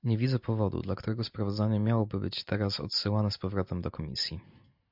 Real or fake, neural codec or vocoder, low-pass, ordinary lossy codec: real; none; 5.4 kHz; MP3, 48 kbps